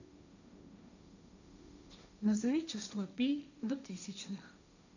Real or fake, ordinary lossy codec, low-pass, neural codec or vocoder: fake; none; 7.2 kHz; codec, 16 kHz, 1.1 kbps, Voila-Tokenizer